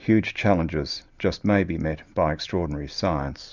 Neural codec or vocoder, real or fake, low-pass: none; real; 7.2 kHz